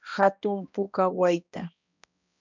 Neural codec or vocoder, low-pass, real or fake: codec, 16 kHz, 1 kbps, X-Codec, HuBERT features, trained on balanced general audio; 7.2 kHz; fake